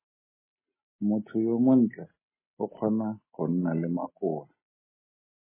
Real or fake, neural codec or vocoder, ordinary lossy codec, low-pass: real; none; MP3, 16 kbps; 3.6 kHz